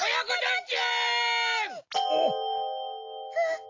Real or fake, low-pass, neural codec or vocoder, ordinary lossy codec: real; 7.2 kHz; none; AAC, 48 kbps